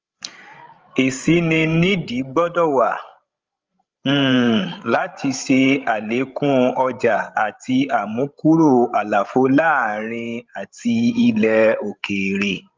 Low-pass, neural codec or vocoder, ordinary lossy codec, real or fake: 7.2 kHz; codec, 16 kHz, 16 kbps, FreqCodec, larger model; Opus, 24 kbps; fake